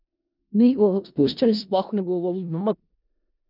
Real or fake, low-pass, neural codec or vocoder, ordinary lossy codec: fake; 5.4 kHz; codec, 16 kHz in and 24 kHz out, 0.4 kbps, LongCat-Audio-Codec, four codebook decoder; none